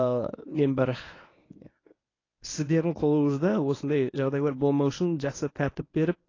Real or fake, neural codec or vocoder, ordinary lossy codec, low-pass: fake; codec, 24 kHz, 0.9 kbps, WavTokenizer, medium speech release version 1; AAC, 32 kbps; 7.2 kHz